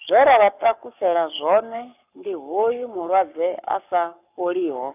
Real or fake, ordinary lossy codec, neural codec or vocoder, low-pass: fake; none; codec, 44.1 kHz, 7.8 kbps, Pupu-Codec; 3.6 kHz